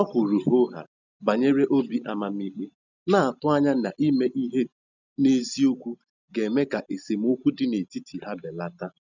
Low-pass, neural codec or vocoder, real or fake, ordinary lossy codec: 7.2 kHz; none; real; none